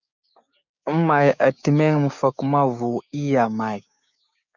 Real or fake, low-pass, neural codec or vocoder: fake; 7.2 kHz; codec, 16 kHz, 6 kbps, DAC